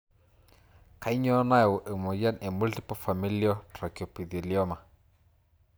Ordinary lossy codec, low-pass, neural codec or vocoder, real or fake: none; none; none; real